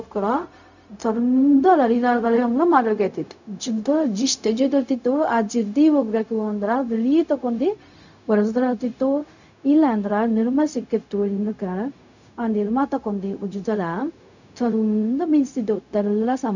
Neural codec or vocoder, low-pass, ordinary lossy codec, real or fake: codec, 16 kHz, 0.4 kbps, LongCat-Audio-Codec; 7.2 kHz; none; fake